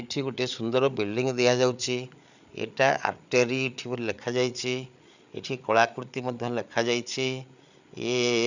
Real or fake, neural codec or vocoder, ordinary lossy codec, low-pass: fake; codec, 16 kHz, 8 kbps, FreqCodec, larger model; none; 7.2 kHz